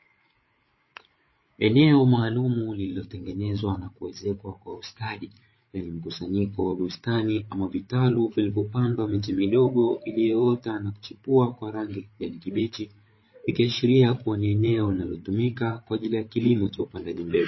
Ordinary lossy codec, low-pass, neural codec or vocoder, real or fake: MP3, 24 kbps; 7.2 kHz; codec, 16 kHz, 8 kbps, FreqCodec, larger model; fake